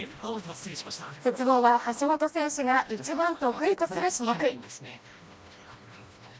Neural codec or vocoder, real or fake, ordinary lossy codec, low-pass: codec, 16 kHz, 1 kbps, FreqCodec, smaller model; fake; none; none